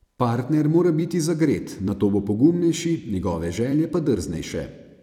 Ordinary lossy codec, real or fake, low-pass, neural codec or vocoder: none; fake; 19.8 kHz; vocoder, 44.1 kHz, 128 mel bands every 256 samples, BigVGAN v2